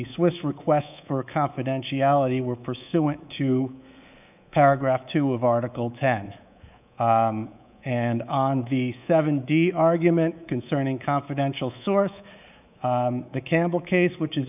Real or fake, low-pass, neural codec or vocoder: fake; 3.6 kHz; codec, 24 kHz, 3.1 kbps, DualCodec